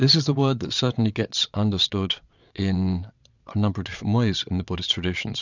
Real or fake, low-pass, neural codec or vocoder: fake; 7.2 kHz; vocoder, 22.05 kHz, 80 mel bands, Vocos